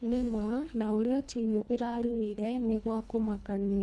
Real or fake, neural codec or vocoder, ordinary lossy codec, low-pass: fake; codec, 24 kHz, 1.5 kbps, HILCodec; none; none